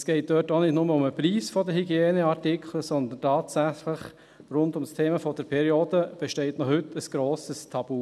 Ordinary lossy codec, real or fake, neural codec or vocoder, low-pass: none; real; none; none